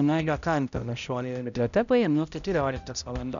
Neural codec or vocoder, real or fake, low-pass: codec, 16 kHz, 0.5 kbps, X-Codec, HuBERT features, trained on balanced general audio; fake; 7.2 kHz